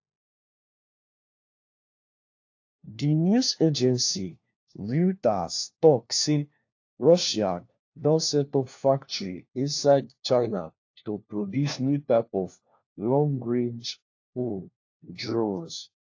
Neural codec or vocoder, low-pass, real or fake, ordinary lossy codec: codec, 16 kHz, 1 kbps, FunCodec, trained on LibriTTS, 50 frames a second; 7.2 kHz; fake; AAC, 48 kbps